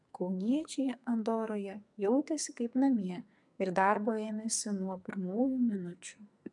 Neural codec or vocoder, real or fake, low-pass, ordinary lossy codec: codec, 44.1 kHz, 2.6 kbps, SNAC; fake; 10.8 kHz; MP3, 96 kbps